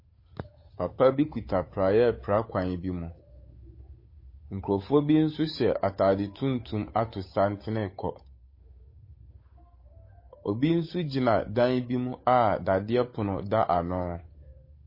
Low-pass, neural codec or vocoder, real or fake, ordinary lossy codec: 5.4 kHz; codec, 16 kHz, 8 kbps, FunCodec, trained on Chinese and English, 25 frames a second; fake; MP3, 24 kbps